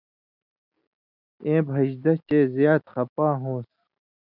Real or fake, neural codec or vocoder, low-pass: real; none; 5.4 kHz